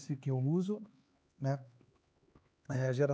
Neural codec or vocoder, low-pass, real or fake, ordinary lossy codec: codec, 16 kHz, 4 kbps, X-Codec, HuBERT features, trained on LibriSpeech; none; fake; none